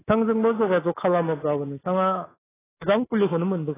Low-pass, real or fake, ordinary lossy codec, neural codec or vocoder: 3.6 kHz; fake; AAC, 16 kbps; codec, 24 kHz, 3.1 kbps, DualCodec